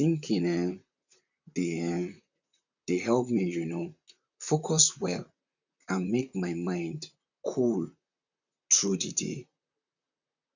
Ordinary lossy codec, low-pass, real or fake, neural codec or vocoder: none; 7.2 kHz; fake; vocoder, 44.1 kHz, 128 mel bands, Pupu-Vocoder